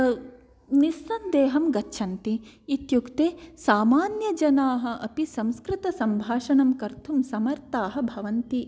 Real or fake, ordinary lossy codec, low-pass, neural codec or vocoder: real; none; none; none